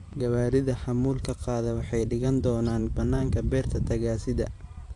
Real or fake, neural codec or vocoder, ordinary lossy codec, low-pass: fake; vocoder, 44.1 kHz, 128 mel bands every 512 samples, BigVGAN v2; AAC, 64 kbps; 10.8 kHz